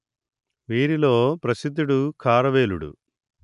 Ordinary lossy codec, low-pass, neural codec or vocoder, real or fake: none; 10.8 kHz; none; real